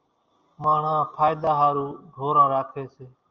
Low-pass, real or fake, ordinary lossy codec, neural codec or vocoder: 7.2 kHz; real; Opus, 32 kbps; none